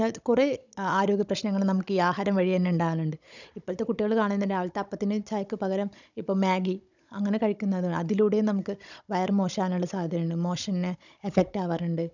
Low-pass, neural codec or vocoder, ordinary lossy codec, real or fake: 7.2 kHz; codec, 16 kHz, 16 kbps, FunCodec, trained on Chinese and English, 50 frames a second; none; fake